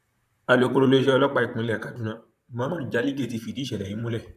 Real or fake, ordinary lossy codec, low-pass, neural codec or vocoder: fake; none; 14.4 kHz; vocoder, 44.1 kHz, 128 mel bands, Pupu-Vocoder